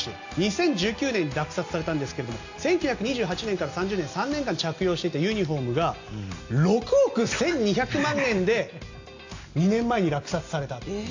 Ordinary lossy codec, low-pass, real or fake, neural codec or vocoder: AAC, 48 kbps; 7.2 kHz; real; none